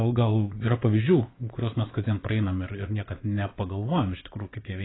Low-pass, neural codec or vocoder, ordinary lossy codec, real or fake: 7.2 kHz; vocoder, 24 kHz, 100 mel bands, Vocos; AAC, 16 kbps; fake